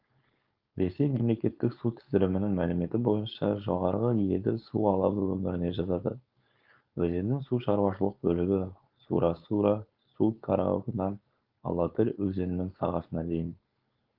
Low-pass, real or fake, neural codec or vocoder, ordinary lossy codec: 5.4 kHz; fake; codec, 16 kHz, 4.8 kbps, FACodec; Opus, 32 kbps